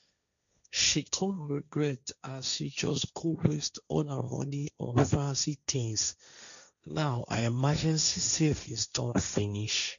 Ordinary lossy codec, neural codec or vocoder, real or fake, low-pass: MP3, 96 kbps; codec, 16 kHz, 1.1 kbps, Voila-Tokenizer; fake; 7.2 kHz